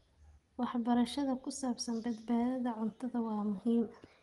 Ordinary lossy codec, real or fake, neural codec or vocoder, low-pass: Opus, 24 kbps; real; none; 10.8 kHz